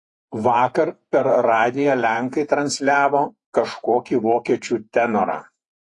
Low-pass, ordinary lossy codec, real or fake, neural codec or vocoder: 10.8 kHz; AAC, 48 kbps; fake; vocoder, 48 kHz, 128 mel bands, Vocos